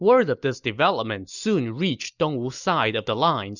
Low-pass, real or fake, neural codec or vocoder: 7.2 kHz; real; none